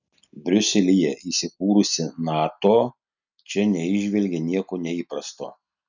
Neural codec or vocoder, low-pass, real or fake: none; 7.2 kHz; real